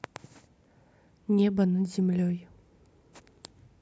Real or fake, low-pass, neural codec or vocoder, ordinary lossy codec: real; none; none; none